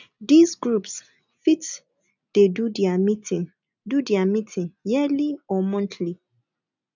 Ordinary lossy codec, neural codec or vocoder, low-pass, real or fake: none; none; 7.2 kHz; real